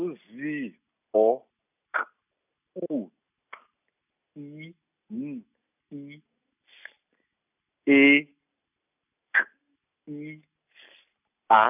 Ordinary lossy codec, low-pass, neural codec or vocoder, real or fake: none; 3.6 kHz; none; real